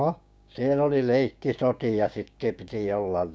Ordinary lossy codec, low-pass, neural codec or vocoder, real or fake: none; none; codec, 16 kHz, 6 kbps, DAC; fake